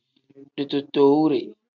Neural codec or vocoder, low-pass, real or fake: none; 7.2 kHz; real